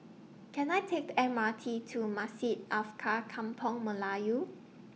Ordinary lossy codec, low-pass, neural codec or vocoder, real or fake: none; none; none; real